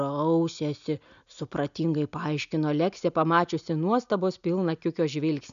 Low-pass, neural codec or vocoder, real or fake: 7.2 kHz; none; real